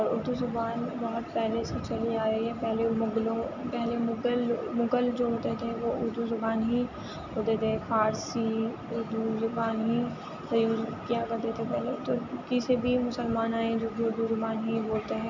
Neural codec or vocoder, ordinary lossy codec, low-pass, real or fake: none; none; 7.2 kHz; real